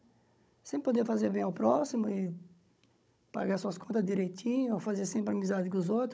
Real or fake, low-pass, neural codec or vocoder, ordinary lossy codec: fake; none; codec, 16 kHz, 16 kbps, FunCodec, trained on Chinese and English, 50 frames a second; none